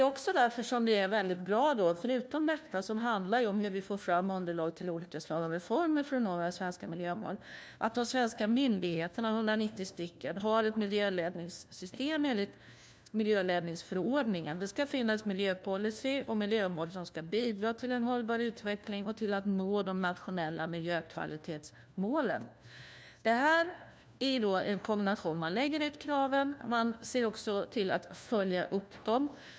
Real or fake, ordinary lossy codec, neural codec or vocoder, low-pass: fake; none; codec, 16 kHz, 1 kbps, FunCodec, trained on LibriTTS, 50 frames a second; none